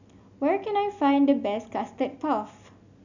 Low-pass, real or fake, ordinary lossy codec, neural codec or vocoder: 7.2 kHz; real; none; none